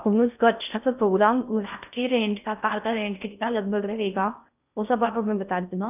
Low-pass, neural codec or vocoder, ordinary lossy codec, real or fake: 3.6 kHz; codec, 16 kHz in and 24 kHz out, 0.6 kbps, FocalCodec, streaming, 2048 codes; none; fake